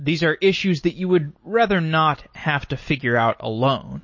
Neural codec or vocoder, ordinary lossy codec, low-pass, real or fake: none; MP3, 32 kbps; 7.2 kHz; real